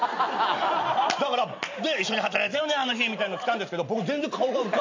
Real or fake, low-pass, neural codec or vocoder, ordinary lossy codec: real; 7.2 kHz; none; MP3, 64 kbps